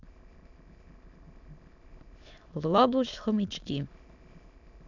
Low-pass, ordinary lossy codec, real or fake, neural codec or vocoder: 7.2 kHz; AAC, 48 kbps; fake; autoencoder, 22.05 kHz, a latent of 192 numbers a frame, VITS, trained on many speakers